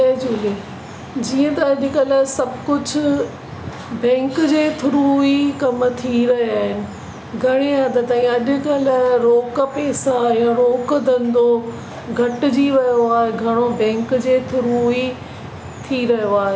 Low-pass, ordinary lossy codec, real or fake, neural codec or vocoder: none; none; real; none